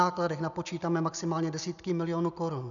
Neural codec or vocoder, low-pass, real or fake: none; 7.2 kHz; real